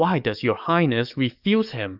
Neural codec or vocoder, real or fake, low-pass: codec, 44.1 kHz, 7.8 kbps, DAC; fake; 5.4 kHz